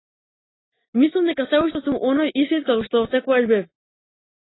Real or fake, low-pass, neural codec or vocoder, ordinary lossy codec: real; 7.2 kHz; none; AAC, 16 kbps